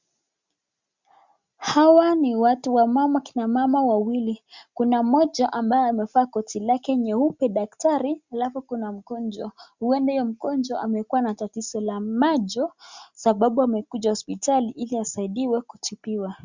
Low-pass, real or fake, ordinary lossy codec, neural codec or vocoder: 7.2 kHz; real; Opus, 64 kbps; none